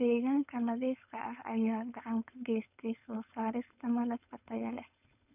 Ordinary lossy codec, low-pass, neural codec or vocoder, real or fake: none; 3.6 kHz; codec, 16 kHz, 4.8 kbps, FACodec; fake